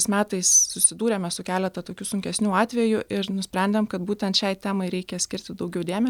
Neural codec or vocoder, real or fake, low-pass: none; real; 19.8 kHz